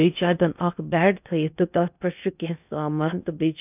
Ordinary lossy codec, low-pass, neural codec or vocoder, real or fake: none; 3.6 kHz; codec, 16 kHz in and 24 kHz out, 0.6 kbps, FocalCodec, streaming, 2048 codes; fake